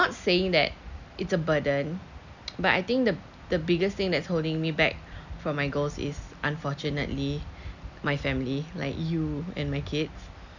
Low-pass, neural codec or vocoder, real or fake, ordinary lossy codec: 7.2 kHz; none; real; none